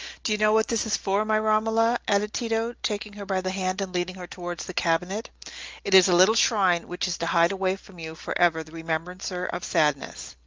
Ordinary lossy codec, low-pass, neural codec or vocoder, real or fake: Opus, 24 kbps; 7.2 kHz; none; real